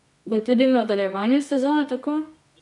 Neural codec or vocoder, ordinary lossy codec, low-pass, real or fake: codec, 24 kHz, 0.9 kbps, WavTokenizer, medium music audio release; none; 10.8 kHz; fake